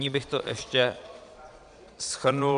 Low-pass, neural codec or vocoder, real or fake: 9.9 kHz; vocoder, 22.05 kHz, 80 mel bands, Vocos; fake